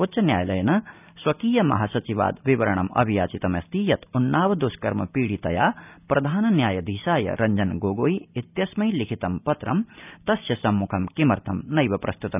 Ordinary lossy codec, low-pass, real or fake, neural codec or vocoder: none; 3.6 kHz; real; none